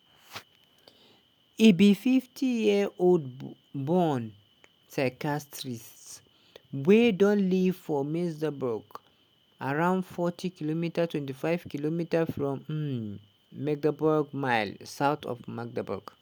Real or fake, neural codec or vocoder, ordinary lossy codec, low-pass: real; none; none; none